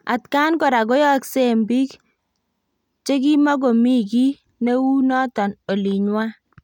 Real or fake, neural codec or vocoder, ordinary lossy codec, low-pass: real; none; none; 19.8 kHz